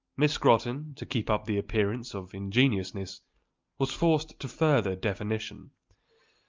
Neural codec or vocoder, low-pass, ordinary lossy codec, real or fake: none; 7.2 kHz; Opus, 24 kbps; real